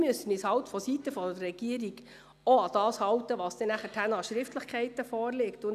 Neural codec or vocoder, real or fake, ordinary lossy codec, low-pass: none; real; none; 14.4 kHz